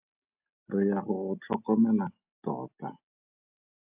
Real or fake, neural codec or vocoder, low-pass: real; none; 3.6 kHz